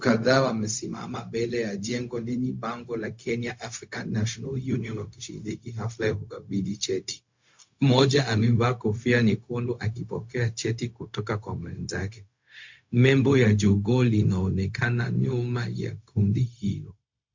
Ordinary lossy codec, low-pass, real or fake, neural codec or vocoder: MP3, 48 kbps; 7.2 kHz; fake; codec, 16 kHz, 0.4 kbps, LongCat-Audio-Codec